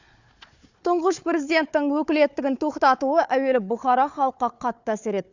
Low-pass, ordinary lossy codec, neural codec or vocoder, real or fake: 7.2 kHz; Opus, 64 kbps; codec, 16 kHz, 4 kbps, FunCodec, trained on Chinese and English, 50 frames a second; fake